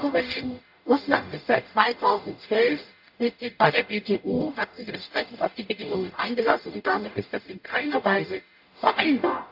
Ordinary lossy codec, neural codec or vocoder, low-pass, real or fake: AAC, 48 kbps; codec, 44.1 kHz, 0.9 kbps, DAC; 5.4 kHz; fake